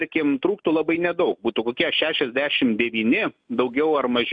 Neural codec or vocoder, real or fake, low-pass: none; real; 9.9 kHz